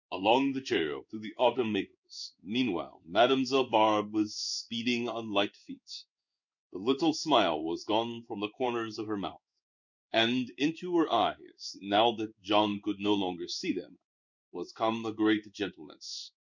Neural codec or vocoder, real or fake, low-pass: codec, 16 kHz in and 24 kHz out, 1 kbps, XY-Tokenizer; fake; 7.2 kHz